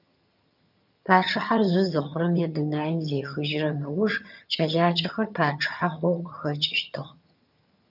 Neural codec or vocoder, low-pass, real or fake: vocoder, 22.05 kHz, 80 mel bands, HiFi-GAN; 5.4 kHz; fake